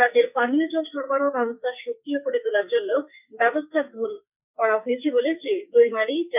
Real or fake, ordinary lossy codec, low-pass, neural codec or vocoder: fake; none; 3.6 kHz; codec, 44.1 kHz, 2.6 kbps, SNAC